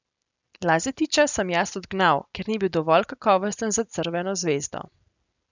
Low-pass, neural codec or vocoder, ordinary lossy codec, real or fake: 7.2 kHz; none; none; real